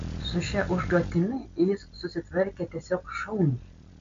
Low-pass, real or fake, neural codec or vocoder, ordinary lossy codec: 7.2 kHz; real; none; MP3, 48 kbps